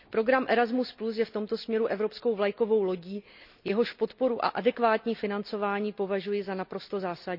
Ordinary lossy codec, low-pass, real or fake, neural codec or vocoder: none; 5.4 kHz; real; none